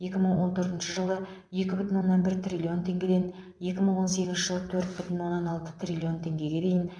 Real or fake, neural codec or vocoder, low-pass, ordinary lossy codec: fake; vocoder, 22.05 kHz, 80 mel bands, WaveNeXt; none; none